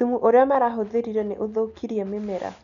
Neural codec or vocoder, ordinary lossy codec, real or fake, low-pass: none; none; real; 7.2 kHz